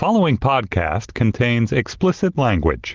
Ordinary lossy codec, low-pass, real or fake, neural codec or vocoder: Opus, 16 kbps; 7.2 kHz; real; none